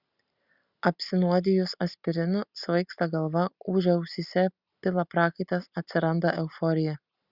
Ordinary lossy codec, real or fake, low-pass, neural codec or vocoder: Opus, 64 kbps; real; 5.4 kHz; none